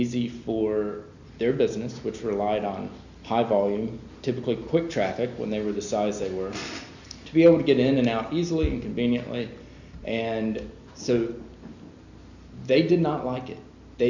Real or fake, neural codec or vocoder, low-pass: real; none; 7.2 kHz